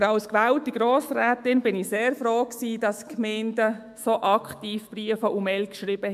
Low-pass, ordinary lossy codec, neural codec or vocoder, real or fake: 14.4 kHz; MP3, 96 kbps; autoencoder, 48 kHz, 128 numbers a frame, DAC-VAE, trained on Japanese speech; fake